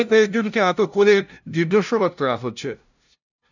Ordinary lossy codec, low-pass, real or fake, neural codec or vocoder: none; 7.2 kHz; fake; codec, 16 kHz, 0.5 kbps, FunCodec, trained on LibriTTS, 25 frames a second